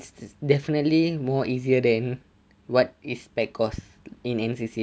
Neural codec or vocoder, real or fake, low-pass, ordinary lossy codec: none; real; none; none